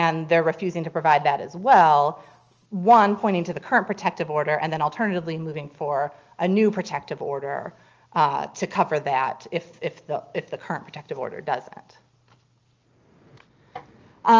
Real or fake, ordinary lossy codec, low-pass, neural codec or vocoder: real; Opus, 24 kbps; 7.2 kHz; none